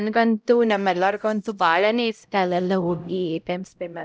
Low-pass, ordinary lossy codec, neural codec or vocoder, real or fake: none; none; codec, 16 kHz, 0.5 kbps, X-Codec, HuBERT features, trained on LibriSpeech; fake